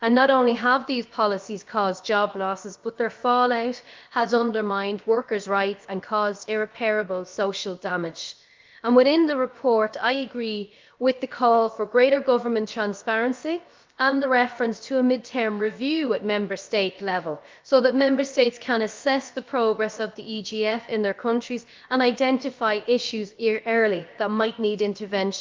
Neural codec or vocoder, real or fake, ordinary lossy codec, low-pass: codec, 16 kHz, about 1 kbps, DyCAST, with the encoder's durations; fake; Opus, 24 kbps; 7.2 kHz